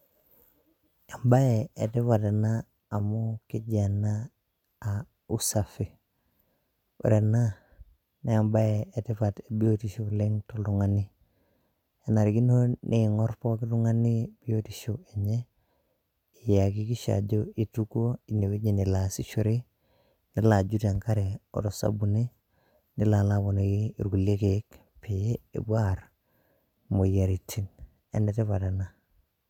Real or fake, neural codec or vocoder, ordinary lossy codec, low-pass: real; none; none; 19.8 kHz